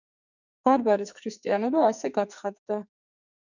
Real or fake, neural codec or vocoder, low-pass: fake; codec, 44.1 kHz, 2.6 kbps, SNAC; 7.2 kHz